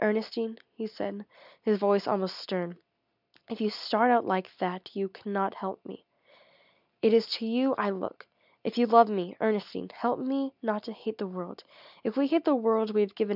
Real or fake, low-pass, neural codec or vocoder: real; 5.4 kHz; none